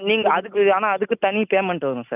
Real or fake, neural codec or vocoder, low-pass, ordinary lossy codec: real; none; 3.6 kHz; none